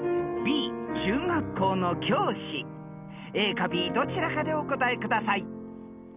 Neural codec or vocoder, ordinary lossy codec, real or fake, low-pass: none; none; real; 3.6 kHz